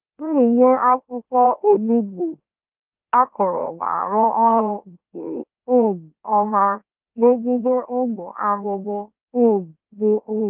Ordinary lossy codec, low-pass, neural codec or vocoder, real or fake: Opus, 24 kbps; 3.6 kHz; autoencoder, 44.1 kHz, a latent of 192 numbers a frame, MeloTTS; fake